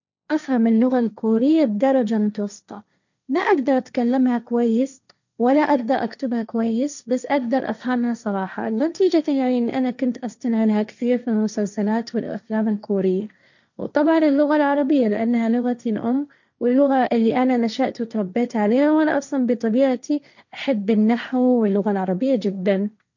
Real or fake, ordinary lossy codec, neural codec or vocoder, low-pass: fake; none; codec, 16 kHz, 1.1 kbps, Voila-Tokenizer; 7.2 kHz